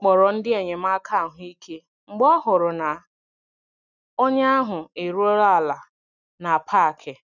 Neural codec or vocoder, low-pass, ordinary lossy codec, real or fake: none; 7.2 kHz; none; real